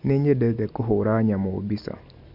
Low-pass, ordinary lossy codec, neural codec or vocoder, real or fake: 5.4 kHz; none; none; real